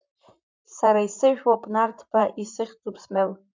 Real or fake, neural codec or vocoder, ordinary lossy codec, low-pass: fake; vocoder, 44.1 kHz, 128 mel bands, Pupu-Vocoder; MP3, 64 kbps; 7.2 kHz